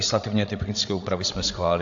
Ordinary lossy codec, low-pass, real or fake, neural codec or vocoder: AAC, 64 kbps; 7.2 kHz; fake; codec, 16 kHz, 16 kbps, FreqCodec, larger model